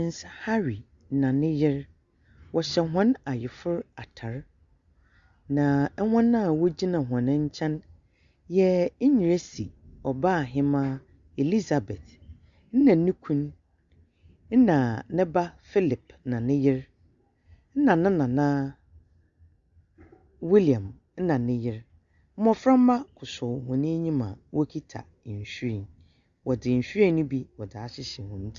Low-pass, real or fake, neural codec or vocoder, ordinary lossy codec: 7.2 kHz; real; none; Opus, 64 kbps